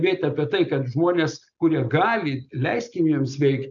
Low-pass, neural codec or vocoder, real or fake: 7.2 kHz; none; real